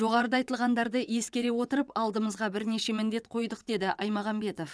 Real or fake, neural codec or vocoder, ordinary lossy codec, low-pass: fake; vocoder, 22.05 kHz, 80 mel bands, WaveNeXt; none; none